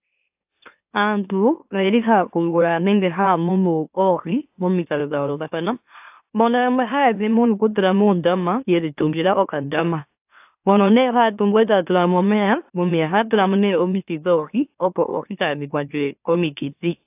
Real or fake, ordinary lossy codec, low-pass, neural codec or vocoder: fake; AAC, 32 kbps; 3.6 kHz; autoencoder, 44.1 kHz, a latent of 192 numbers a frame, MeloTTS